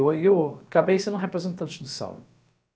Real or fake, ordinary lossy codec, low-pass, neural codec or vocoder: fake; none; none; codec, 16 kHz, about 1 kbps, DyCAST, with the encoder's durations